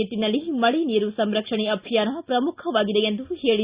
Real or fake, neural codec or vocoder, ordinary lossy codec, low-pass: real; none; Opus, 64 kbps; 3.6 kHz